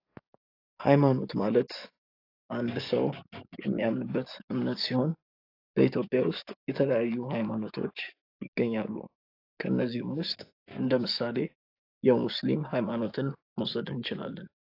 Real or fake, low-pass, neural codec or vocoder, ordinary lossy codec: fake; 5.4 kHz; codec, 44.1 kHz, 7.8 kbps, DAC; AAC, 32 kbps